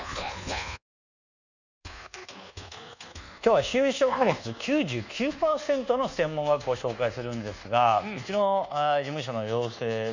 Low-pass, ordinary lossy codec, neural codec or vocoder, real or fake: 7.2 kHz; none; codec, 24 kHz, 1.2 kbps, DualCodec; fake